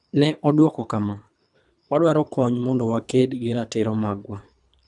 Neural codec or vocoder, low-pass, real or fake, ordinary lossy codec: codec, 24 kHz, 3 kbps, HILCodec; 10.8 kHz; fake; none